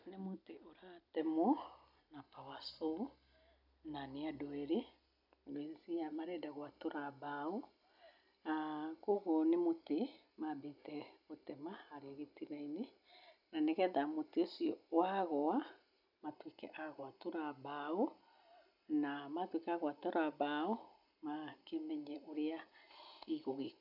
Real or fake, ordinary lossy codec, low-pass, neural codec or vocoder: real; none; 5.4 kHz; none